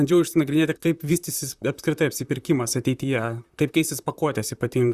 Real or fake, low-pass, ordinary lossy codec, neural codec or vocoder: fake; 14.4 kHz; Opus, 64 kbps; vocoder, 44.1 kHz, 128 mel bands, Pupu-Vocoder